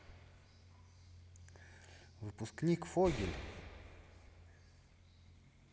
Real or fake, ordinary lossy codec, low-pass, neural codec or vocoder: real; none; none; none